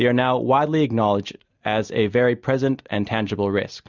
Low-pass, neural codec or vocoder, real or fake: 7.2 kHz; none; real